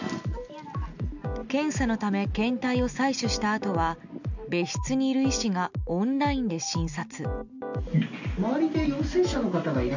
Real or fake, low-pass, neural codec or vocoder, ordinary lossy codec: real; 7.2 kHz; none; none